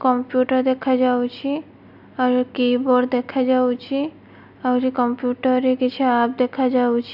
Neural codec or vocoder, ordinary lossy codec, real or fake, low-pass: none; AAC, 48 kbps; real; 5.4 kHz